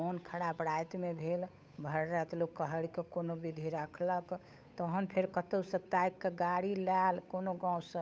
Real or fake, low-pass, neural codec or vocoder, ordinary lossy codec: fake; none; codec, 16 kHz, 8 kbps, FunCodec, trained on Chinese and English, 25 frames a second; none